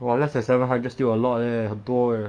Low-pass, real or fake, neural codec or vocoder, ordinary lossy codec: 9.9 kHz; fake; codec, 44.1 kHz, 7.8 kbps, DAC; none